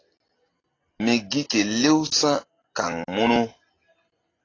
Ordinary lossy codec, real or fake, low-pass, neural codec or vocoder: AAC, 32 kbps; real; 7.2 kHz; none